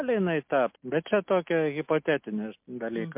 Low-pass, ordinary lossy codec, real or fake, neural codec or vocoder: 3.6 kHz; MP3, 32 kbps; real; none